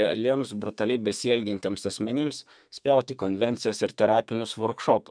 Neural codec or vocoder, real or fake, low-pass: codec, 32 kHz, 1.9 kbps, SNAC; fake; 9.9 kHz